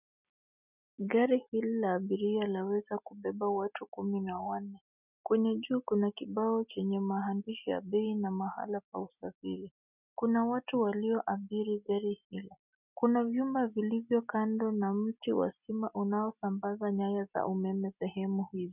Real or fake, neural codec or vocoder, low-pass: real; none; 3.6 kHz